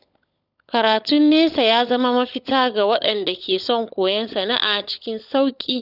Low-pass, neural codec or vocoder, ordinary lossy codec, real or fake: 5.4 kHz; codec, 16 kHz, 16 kbps, FunCodec, trained on LibriTTS, 50 frames a second; none; fake